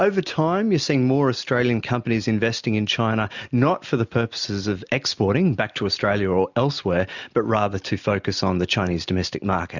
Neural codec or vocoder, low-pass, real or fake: none; 7.2 kHz; real